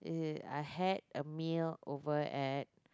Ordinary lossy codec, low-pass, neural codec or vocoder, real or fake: none; none; none; real